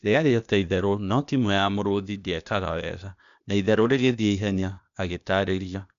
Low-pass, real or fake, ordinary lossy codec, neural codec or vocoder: 7.2 kHz; fake; none; codec, 16 kHz, 0.8 kbps, ZipCodec